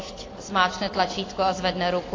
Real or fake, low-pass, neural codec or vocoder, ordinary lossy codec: real; 7.2 kHz; none; AAC, 32 kbps